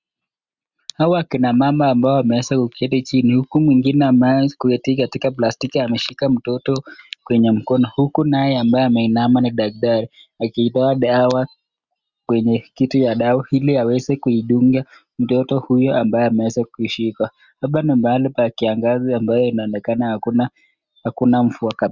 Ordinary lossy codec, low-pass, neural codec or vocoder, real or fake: Opus, 64 kbps; 7.2 kHz; none; real